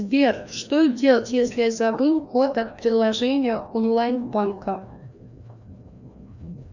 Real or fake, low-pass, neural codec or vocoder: fake; 7.2 kHz; codec, 16 kHz, 1 kbps, FreqCodec, larger model